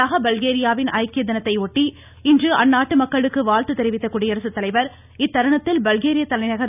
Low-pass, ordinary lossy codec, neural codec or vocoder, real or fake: 3.6 kHz; none; none; real